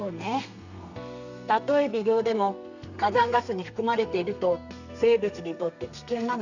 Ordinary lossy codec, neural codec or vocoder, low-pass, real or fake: none; codec, 32 kHz, 1.9 kbps, SNAC; 7.2 kHz; fake